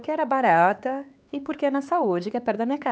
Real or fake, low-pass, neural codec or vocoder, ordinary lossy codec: fake; none; codec, 16 kHz, 4 kbps, X-Codec, HuBERT features, trained on LibriSpeech; none